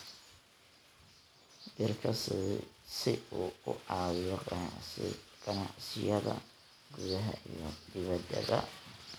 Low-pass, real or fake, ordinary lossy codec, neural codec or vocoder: none; real; none; none